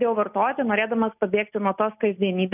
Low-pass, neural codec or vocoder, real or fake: 3.6 kHz; none; real